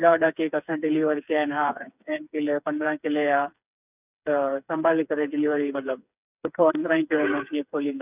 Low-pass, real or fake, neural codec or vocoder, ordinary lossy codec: 3.6 kHz; fake; codec, 16 kHz, 4 kbps, FreqCodec, smaller model; none